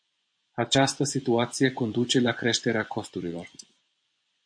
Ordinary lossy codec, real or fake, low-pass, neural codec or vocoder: MP3, 64 kbps; fake; 9.9 kHz; vocoder, 24 kHz, 100 mel bands, Vocos